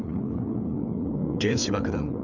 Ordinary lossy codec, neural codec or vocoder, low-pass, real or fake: Opus, 64 kbps; codec, 16 kHz, 4 kbps, FreqCodec, larger model; 7.2 kHz; fake